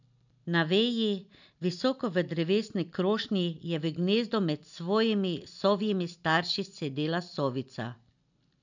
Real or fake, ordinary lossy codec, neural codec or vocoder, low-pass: real; none; none; 7.2 kHz